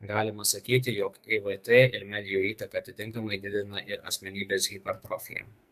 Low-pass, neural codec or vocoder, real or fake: 14.4 kHz; codec, 44.1 kHz, 2.6 kbps, SNAC; fake